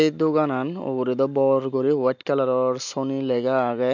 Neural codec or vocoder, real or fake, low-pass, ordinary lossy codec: none; real; 7.2 kHz; none